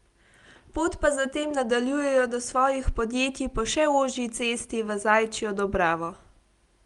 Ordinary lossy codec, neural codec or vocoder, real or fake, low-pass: Opus, 32 kbps; none; real; 10.8 kHz